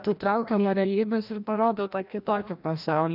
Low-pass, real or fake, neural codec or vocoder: 5.4 kHz; fake; codec, 16 kHz, 1 kbps, FreqCodec, larger model